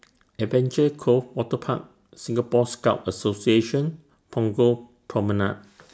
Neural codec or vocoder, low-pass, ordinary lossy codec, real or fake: none; none; none; real